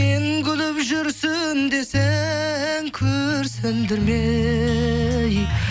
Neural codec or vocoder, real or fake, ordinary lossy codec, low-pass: none; real; none; none